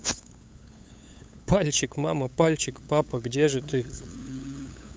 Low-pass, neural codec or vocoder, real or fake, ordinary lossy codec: none; codec, 16 kHz, 8 kbps, FunCodec, trained on LibriTTS, 25 frames a second; fake; none